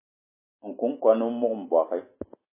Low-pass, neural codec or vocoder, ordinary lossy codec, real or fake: 3.6 kHz; none; MP3, 16 kbps; real